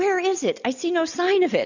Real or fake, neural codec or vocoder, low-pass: real; none; 7.2 kHz